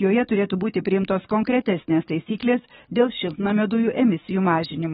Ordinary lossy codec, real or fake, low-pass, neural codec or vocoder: AAC, 16 kbps; fake; 19.8 kHz; codec, 44.1 kHz, 7.8 kbps, DAC